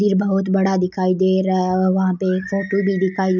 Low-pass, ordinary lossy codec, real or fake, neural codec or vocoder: 7.2 kHz; none; real; none